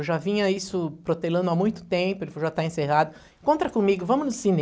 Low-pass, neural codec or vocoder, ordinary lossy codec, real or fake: none; none; none; real